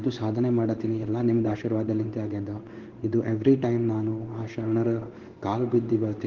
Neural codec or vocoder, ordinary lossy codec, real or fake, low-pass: codec, 16 kHz in and 24 kHz out, 1 kbps, XY-Tokenizer; Opus, 16 kbps; fake; 7.2 kHz